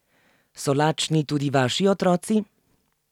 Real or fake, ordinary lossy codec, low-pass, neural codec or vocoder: real; none; 19.8 kHz; none